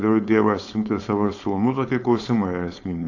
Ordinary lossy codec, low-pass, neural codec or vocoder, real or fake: AAC, 48 kbps; 7.2 kHz; codec, 16 kHz, 4.8 kbps, FACodec; fake